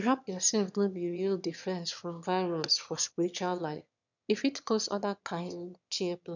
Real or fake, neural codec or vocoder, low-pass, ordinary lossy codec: fake; autoencoder, 22.05 kHz, a latent of 192 numbers a frame, VITS, trained on one speaker; 7.2 kHz; none